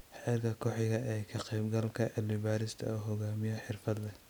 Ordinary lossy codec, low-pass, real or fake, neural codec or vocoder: none; none; real; none